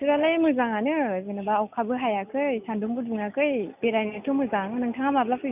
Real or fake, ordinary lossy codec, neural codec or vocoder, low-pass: real; none; none; 3.6 kHz